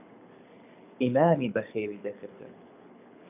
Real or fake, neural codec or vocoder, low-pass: fake; codec, 24 kHz, 6 kbps, HILCodec; 3.6 kHz